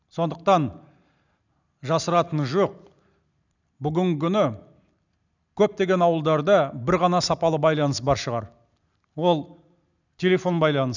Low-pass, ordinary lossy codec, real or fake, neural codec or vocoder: 7.2 kHz; none; real; none